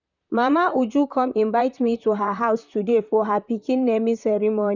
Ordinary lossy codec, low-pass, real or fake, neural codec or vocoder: none; 7.2 kHz; fake; vocoder, 44.1 kHz, 128 mel bands, Pupu-Vocoder